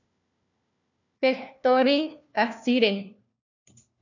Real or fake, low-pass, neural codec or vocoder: fake; 7.2 kHz; codec, 16 kHz, 1 kbps, FunCodec, trained on LibriTTS, 50 frames a second